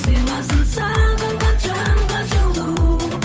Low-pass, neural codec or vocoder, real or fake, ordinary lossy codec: none; codec, 16 kHz, 8 kbps, FunCodec, trained on Chinese and English, 25 frames a second; fake; none